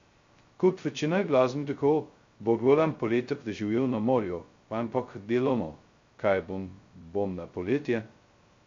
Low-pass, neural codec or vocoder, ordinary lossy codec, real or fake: 7.2 kHz; codec, 16 kHz, 0.2 kbps, FocalCodec; MP3, 48 kbps; fake